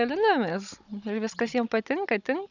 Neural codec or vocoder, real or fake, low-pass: codec, 16 kHz, 16 kbps, FunCodec, trained on Chinese and English, 50 frames a second; fake; 7.2 kHz